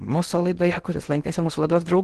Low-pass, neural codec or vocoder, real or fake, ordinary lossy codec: 10.8 kHz; codec, 16 kHz in and 24 kHz out, 0.8 kbps, FocalCodec, streaming, 65536 codes; fake; Opus, 16 kbps